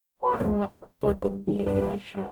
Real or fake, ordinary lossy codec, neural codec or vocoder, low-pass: fake; none; codec, 44.1 kHz, 0.9 kbps, DAC; 19.8 kHz